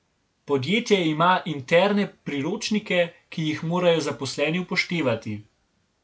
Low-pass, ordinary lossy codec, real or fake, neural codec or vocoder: none; none; real; none